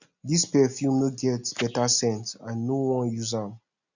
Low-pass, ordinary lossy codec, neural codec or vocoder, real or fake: 7.2 kHz; none; none; real